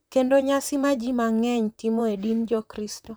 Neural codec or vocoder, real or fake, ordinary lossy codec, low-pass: vocoder, 44.1 kHz, 128 mel bands, Pupu-Vocoder; fake; none; none